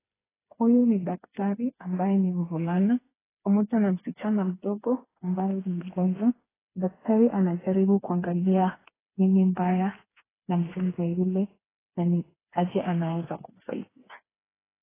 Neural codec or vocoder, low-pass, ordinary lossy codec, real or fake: codec, 16 kHz, 4 kbps, FreqCodec, smaller model; 3.6 kHz; AAC, 16 kbps; fake